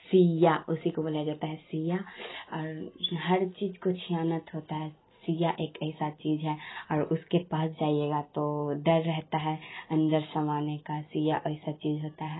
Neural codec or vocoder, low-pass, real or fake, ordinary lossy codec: none; 7.2 kHz; real; AAC, 16 kbps